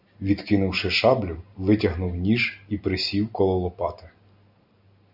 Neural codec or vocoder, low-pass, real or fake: none; 5.4 kHz; real